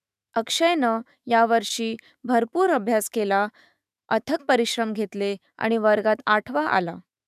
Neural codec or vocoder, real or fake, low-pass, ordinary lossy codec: autoencoder, 48 kHz, 128 numbers a frame, DAC-VAE, trained on Japanese speech; fake; 14.4 kHz; none